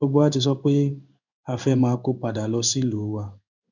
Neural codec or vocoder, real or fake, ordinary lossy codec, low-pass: codec, 16 kHz in and 24 kHz out, 1 kbps, XY-Tokenizer; fake; none; 7.2 kHz